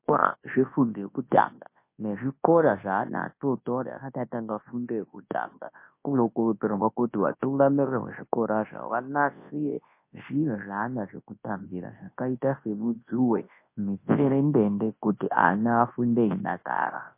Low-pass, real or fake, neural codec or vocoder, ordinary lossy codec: 3.6 kHz; fake; codec, 24 kHz, 0.9 kbps, WavTokenizer, large speech release; MP3, 24 kbps